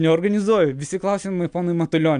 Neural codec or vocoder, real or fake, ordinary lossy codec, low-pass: none; real; MP3, 96 kbps; 9.9 kHz